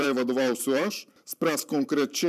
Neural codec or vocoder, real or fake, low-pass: vocoder, 44.1 kHz, 128 mel bands every 512 samples, BigVGAN v2; fake; 14.4 kHz